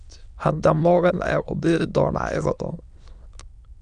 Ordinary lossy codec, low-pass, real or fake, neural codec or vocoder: Opus, 64 kbps; 9.9 kHz; fake; autoencoder, 22.05 kHz, a latent of 192 numbers a frame, VITS, trained on many speakers